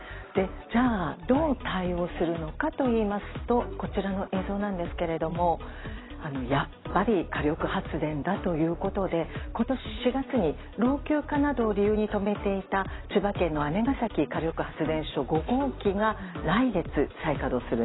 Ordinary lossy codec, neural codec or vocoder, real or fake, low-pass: AAC, 16 kbps; none; real; 7.2 kHz